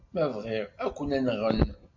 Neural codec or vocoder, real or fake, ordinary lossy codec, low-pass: none; real; MP3, 64 kbps; 7.2 kHz